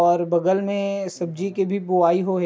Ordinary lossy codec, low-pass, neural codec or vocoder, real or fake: none; none; none; real